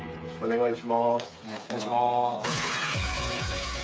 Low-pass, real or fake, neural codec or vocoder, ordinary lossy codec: none; fake; codec, 16 kHz, 8 kbps, FreqCodec, smaller model; none